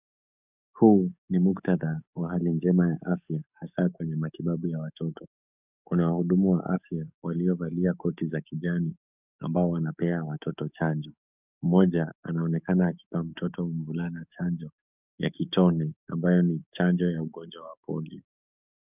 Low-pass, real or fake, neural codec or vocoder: 3.6 kHz; fake; codec, 44.1 kHz, 7.8 kbps, DAC